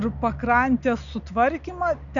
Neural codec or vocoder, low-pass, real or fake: none; 7.2 kHz; real